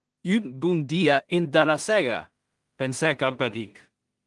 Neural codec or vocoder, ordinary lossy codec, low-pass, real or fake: codec, 16 kHz in and 24 kHz out, 0.4 kbps, LongCat-Audio-Codec, two codebook decoder; Opus, 24 kbps; 10.8 kHz; fake